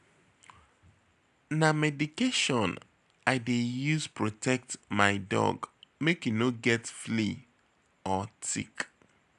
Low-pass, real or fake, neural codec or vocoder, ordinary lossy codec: 10.8 kHz; real; none; none